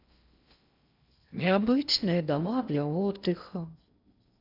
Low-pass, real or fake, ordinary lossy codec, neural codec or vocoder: 5.4 kHz; fake; none; codec, 16 kHz in and 24 kHz out, 0.6 kbps, FocalCodec, streaming, 4096 codes